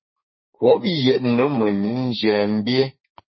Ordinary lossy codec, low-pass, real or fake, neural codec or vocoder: MP3, 24 kbps; 7.2 kHz; fake; codec, 32 kHz, 1.9 kbps, SNAC